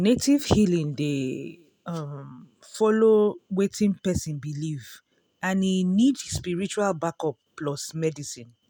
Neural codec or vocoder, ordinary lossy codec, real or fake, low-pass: none; none; real; none